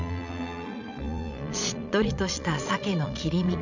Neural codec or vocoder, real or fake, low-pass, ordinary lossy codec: vocoder, 22.05 kHz, 80 mel bands, Vocos; fake; 7.2 kHz; none